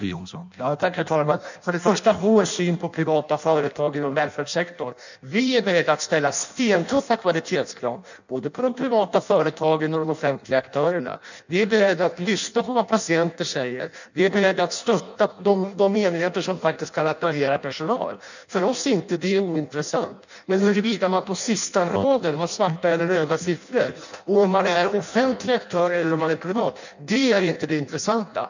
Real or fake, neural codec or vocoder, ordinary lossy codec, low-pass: fake; codec, 16 kHz in and 24 kHz out, 0.6 kbps, FireRedTTS-2 codec; none; 7.2 kHz